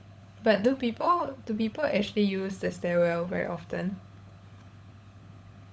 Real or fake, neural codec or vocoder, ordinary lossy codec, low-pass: fake; codec, 16 kHz, 16 kbps, FunCodec, trained on LibriTTS, 50 frames a second; none; none